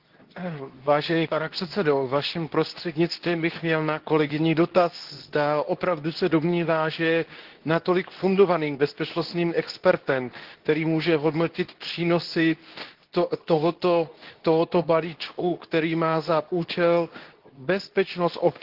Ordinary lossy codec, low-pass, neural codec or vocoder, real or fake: Opus, 16 kbps; 5.4 kHz; codec, 24 kHz, 0.9 kbps, WavTokenizer, medium speech release version 2; fake